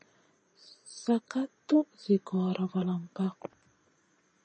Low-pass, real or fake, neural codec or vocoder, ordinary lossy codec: 9.9 kHz; fake; vocoder, 22.05 kHz, 80 mel bands, Vocos; MP3, 32 kbps